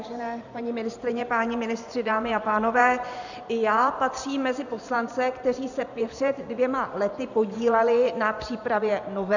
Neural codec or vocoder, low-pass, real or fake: vocoder, 44.1 kHz, 128 mel bands every 512 samples, BigVGAN v2; 7.2 kHz; fake